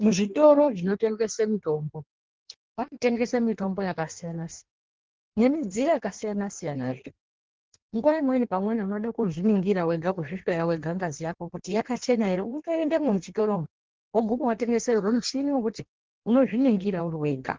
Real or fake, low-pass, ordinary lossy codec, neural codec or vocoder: fake; 7.2 kHz; Opus, 16 kbps; codec, 16 kHz in and 24 kHz out, 1.1 kbps, FireRedTTS-2 codec